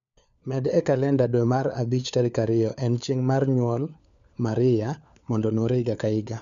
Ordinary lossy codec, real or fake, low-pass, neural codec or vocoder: none; fake; 7.2 kHz; codec, 16 kHz, 4 kbps, FunCodec, trained on LibriTTS, 50 frames a second